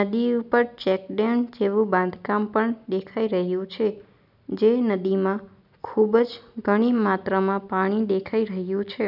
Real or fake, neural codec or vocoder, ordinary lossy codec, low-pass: real; none; none; 5.4 kHz